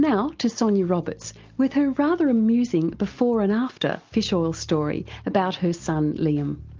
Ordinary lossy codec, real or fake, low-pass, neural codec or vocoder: Opus, 32 kbps; real; 7.2 kHz; none